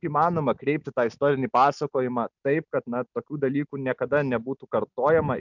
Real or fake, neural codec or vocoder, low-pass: real; none; 7.2 kHz